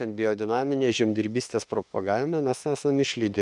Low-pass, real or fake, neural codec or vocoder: 10.8 kHz; fake; autoencoder, 48 kHz, 32 numbers a frame, DAC-VAE, trained on Japanese speech